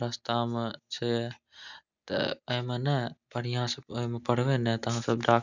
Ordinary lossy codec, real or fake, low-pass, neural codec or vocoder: none; real; 7.2 kHz; none